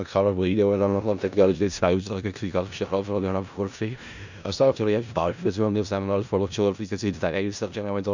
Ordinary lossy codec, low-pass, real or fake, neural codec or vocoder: none; 7.2 kHz; fake; codec, 16 kHz in and 24 kHz out, 0.4 kbps, LongCat-Audio-Codec, four codebook decoder